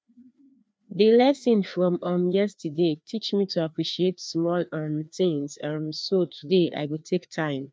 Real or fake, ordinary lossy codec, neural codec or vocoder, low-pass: fake; none; codec, 16 kHz, 2 kbps, FreqCodec, larger model; none